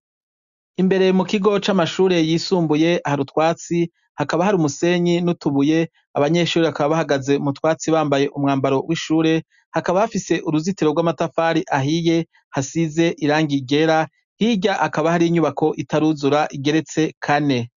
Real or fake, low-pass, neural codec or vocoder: real; 7.2 kHz; none